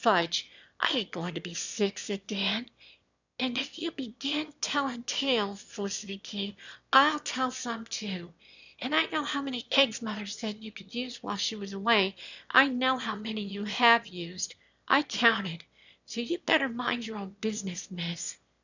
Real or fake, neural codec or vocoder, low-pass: fake; autoencoder, 22.05 kHz, a latent of 192 numbers a frame, VITS, trained on one speaker; 7.2 kHz